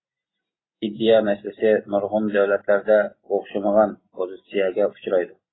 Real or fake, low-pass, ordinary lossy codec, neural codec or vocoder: real; 7.2 kHz; AAC, 16 kbps; none